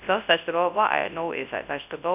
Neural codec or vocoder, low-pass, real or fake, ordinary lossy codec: codec, 24 kHz, 0.9 kbps, WavTokenizer, large speech release; 3.6 kHz; fake; none